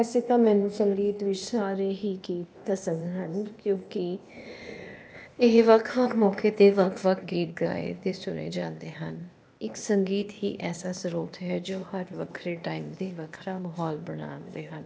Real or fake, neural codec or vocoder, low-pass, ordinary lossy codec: fake; codec, 16 kHz, 0.8 kbps, ZipCodec; none; none